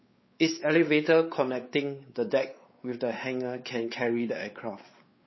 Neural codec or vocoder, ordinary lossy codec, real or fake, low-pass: codec, 16 kHz, 4 kbps, X-Codec, WavLM features, trained on Multilingual LibriSpeech; MP3, 24 kbps; fake; 7.2 kHz